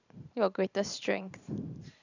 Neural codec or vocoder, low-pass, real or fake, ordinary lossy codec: vocoder, 44.1 kHz, 128 mel bands every 512 samples, BigVGAN v2; 7.2 kHz; fake; none